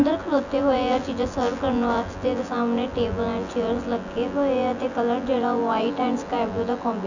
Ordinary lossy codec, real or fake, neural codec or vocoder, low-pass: none; fake; vocoder, 24 kHz, 100 mel bands, Vocos; 7.2 kHz